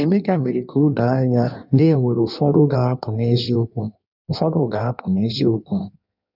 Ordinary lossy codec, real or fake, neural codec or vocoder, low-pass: none; fake; codec, 16 kHz in and 24 kHz out, 1.1 kbps, FireRedTTS-2 codec; 5.4 kHz